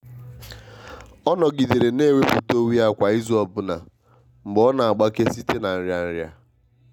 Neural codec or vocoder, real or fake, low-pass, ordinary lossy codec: none; real; 19.8 kHz; none